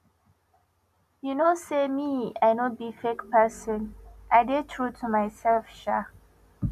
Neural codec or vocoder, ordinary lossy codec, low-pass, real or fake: none; none; 14.4 kHz; real